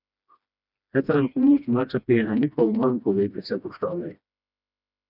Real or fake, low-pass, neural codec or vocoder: fake; 5.4 kHz; codec, 16 kHz, 1 kbps, FreqCodec, smaller model